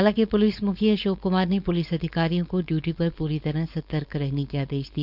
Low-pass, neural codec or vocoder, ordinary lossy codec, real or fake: 5.4 kHz; codec, 16 kHz, 4.8 kbps, FACodec; none; fake